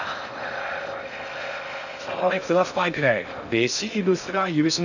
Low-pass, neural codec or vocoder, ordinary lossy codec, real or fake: 7.2 kHz; codec, 16 kHz in and 24 kHz out, 0.6 kbps, FocalCodec, streaming, 4096 codes; none; fake